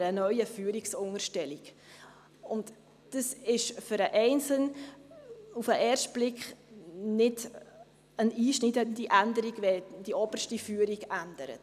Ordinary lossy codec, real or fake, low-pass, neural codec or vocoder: none; real; 14.4 kHz; none